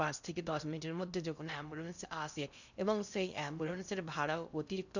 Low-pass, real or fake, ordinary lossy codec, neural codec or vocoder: 7.2 kHz; fake; none; codec, 16 kHz in and 24 kHz out, 0.8 kbps, FocalCodec, streaming, 65536 codes